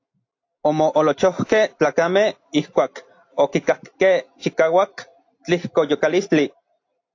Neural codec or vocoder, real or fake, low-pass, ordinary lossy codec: none; real; 7.2 kHz; AAC, 48 kbps